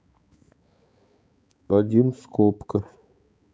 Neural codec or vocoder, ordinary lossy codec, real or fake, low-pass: codec, 16 kHz, 4 kbps, X-Codec, HuBERT features, trained on balanced general audio; none; fake; none